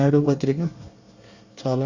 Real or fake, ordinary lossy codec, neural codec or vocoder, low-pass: fake; Opus, 64 kbps; codec, 24 kHz, 1 kbps, SNAC; 7.2 kHz